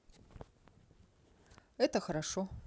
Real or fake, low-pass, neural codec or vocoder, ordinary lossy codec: real; none; none; none